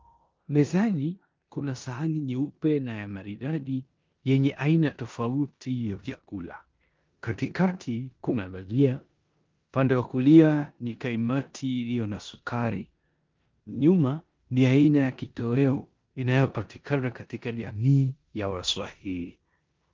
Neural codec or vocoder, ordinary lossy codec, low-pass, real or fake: codec, 16 kHz in and 24 kHz out, 0.9 kbps, LongCat-Audio-Codec, four codebook decoder; Opus, 32 kbps; 7.2 kHz; fake